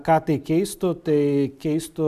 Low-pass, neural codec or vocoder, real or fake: 14.4 kHz; none; real